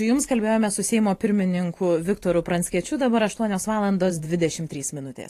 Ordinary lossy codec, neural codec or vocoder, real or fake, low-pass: AAC, 48 kbps; vocoder, 44.1 kHz, 128 mel bands every 512 samples, BigVGAN v2; fake; 14.4 kHz